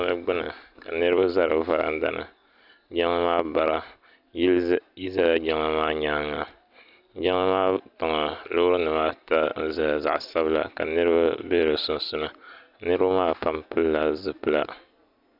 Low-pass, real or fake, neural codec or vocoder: 5.4 kHz; real; none